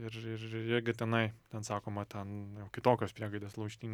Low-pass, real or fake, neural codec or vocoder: 19.8 kHz; real; none